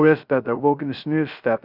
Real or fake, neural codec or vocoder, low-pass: fake; codec, 16 kHz, 0.3 kbps, FocalCodec; 5.4 kHz